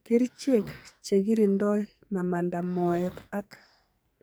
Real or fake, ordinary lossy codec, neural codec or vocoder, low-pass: fake; none; codec, 44.1 kHz, 2.6 kbps, SNAC; none